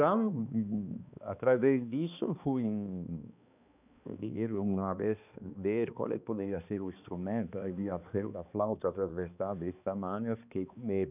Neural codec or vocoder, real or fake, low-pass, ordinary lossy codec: codec, 16 kHz, 2 kbps, X-Codec, HuBERT features, trained on balanced general audio; fake; 3.6 kHz; none